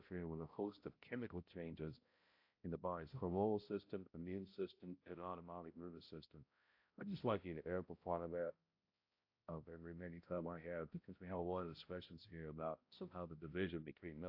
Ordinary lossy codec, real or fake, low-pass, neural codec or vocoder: AAC, 48 kbps; fake; 5.4 kHz; codec, 16 kHz, 0.5 kbps, X-Codec, HuBERT features, trained on balanced general audio